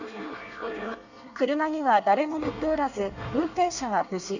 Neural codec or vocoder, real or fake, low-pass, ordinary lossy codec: codec, 24 kHz, 1 kbps, SNAC; fake; 7.2 kHz; none